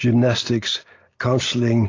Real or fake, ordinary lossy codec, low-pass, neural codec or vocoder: real; AAC, 32 kbps; 7.2 kHz; none